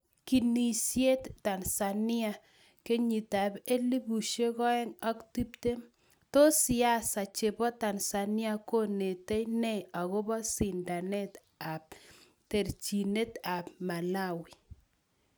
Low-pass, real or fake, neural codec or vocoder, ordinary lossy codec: none; real; none; none